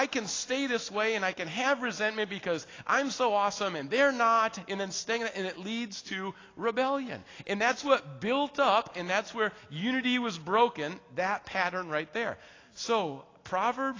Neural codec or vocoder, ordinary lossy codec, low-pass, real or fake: none; AAC, 32 kbps; 7.2 kHz; real